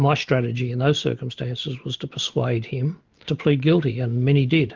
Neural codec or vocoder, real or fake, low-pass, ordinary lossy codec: none; real; 7.2 kHz; Opus, 32 kbps